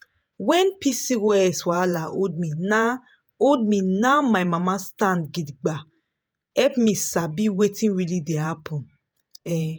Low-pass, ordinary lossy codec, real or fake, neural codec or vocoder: none; none; fake; vocoder, 48 kHz, 128 mel bands, Vocos